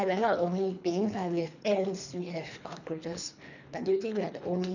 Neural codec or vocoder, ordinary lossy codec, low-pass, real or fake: codec, 24 kHz, 3 kbps, HILCodec; none; 7.2 kHz; fake